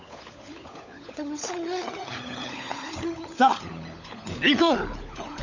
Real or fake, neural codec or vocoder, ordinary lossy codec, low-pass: fake; codec, 16 kHz, 16 kbps, FunCodec, trained on LibriTTS, 50 frames a second; none; 7.2 kHz